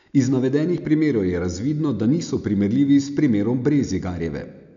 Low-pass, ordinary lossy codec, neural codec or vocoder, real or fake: 7.2 kHz; none; none; real